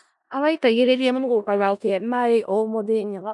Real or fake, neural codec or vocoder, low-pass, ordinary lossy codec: fake; codec, 16 kHz in and 24 kHz out, 0.4 kbps, LongCat-Audio-Codec, four codebook decoder; 10.8 kHz; none